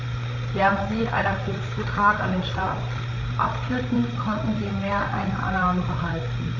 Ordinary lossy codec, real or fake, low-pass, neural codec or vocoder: AAC, 48 kbps; fake; 7.2 kHz; codec, 16 kHz, 8 kbps, FreqCodec, larger model